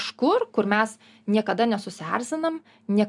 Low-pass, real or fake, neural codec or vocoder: 10.8 kHz; real; none